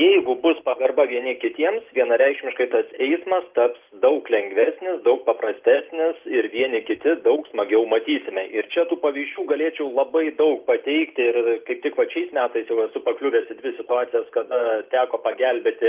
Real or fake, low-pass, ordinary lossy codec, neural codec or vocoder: real; 3.6 kHz; Opus, 32 kbps; none